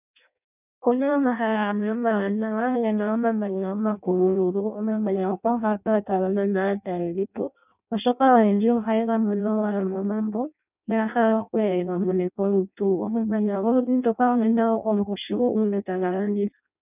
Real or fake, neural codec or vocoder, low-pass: fake; codec, 16 kHz in and 24 kHz out, 0.6 kbps, FireRedTTS-2 codec; 3.6 kHz